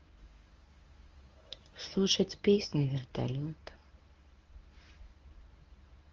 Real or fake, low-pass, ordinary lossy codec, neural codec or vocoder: fake; 7.2 kHz; Opus, 32 kbps; codec, 24 kHz, 0.9 kbps, WavTokenizer, medium speech release version 1